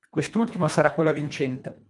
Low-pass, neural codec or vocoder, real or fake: 10.8 kHz; codec, 24 kHz, 1.5 kbps, HILCodec; fake